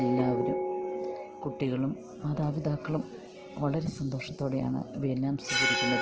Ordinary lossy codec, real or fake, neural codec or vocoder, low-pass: Opus, 16 kbps; real; none; 7.2 kHz